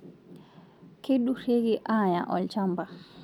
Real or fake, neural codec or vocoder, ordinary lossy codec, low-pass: real; none; none; 19.8 kHz